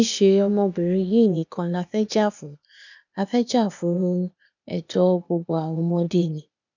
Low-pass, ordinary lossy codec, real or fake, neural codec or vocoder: 7.2 kHz; none; fake; codec, 16 kHz, 0.8 kbps, ZipCodec